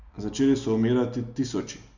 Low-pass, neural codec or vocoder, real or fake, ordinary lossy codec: 7.2 kHz; none; real; none